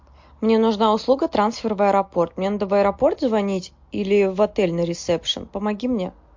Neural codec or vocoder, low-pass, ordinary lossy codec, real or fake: none; 7.2 kHz; MP3, 48 kbps; real